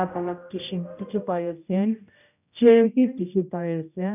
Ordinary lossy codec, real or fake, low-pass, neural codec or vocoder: none; fake; 3.6 kHz; codec, 16 kHz, 0.5 kbps, X-Codec, HuBERT features, trained on general audio